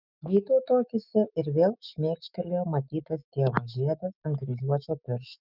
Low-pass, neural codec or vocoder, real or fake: 5.4 kHz; autoencoder, 48 kHz, 128 numbers a frame, DAC-VAE, trained on Japanese speech; fake